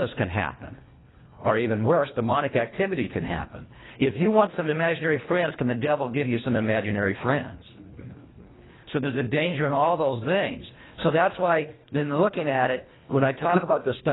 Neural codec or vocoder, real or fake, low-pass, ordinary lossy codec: codec, 24 kHz, 1.5 kbps, HILCodec; fake; 7.2 kHz; AAC, 16 kbps